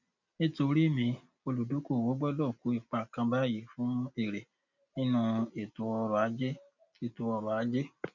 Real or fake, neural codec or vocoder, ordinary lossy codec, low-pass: real; none; none; 7.2 kHz